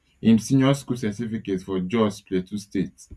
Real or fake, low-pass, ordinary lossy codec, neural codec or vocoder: real; none; none; none